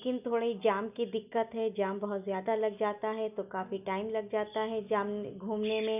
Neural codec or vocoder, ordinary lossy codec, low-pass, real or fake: none; none; 3.6 kHz; real